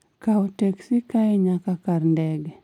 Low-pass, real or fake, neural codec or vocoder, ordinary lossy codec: 19.8 kHz; real; none; none